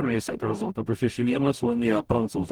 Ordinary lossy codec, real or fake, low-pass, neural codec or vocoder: Opus, 24 kbps; fake; 19.8 kHz; codec, 44.1 kHz, 0.9 kbps, DAC